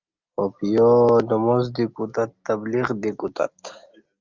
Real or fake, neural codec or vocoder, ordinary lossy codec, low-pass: real; none; Opus, 32 kbps; 7.2 kHz